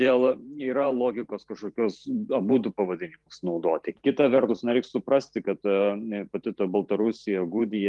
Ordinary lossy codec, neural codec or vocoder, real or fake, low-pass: Opus, 24 kbps; vocoder, 44.1 kHz, 128 mel bands every 512 samples, BigVGAN v2; fake; 10.8 kHz